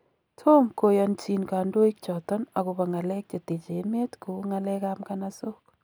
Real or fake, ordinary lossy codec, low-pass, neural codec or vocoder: real; none; none; none